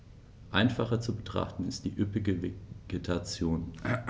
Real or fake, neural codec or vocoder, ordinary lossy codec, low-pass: real; none; none; none